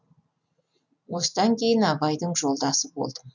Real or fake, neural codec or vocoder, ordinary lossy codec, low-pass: real; none; none; 7.2 kHz